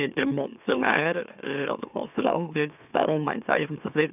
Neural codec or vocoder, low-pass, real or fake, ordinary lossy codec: autoencoder, 44.1 kHz, a latent of 192 numbers a frame, MeloTTS; 3.6 kHz; fake; none